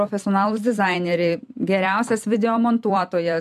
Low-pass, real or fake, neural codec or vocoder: 14.4 kHz; fake; vocoder, 44.1 kHz, 128 mel bands every 256 samples, BigVGAN v2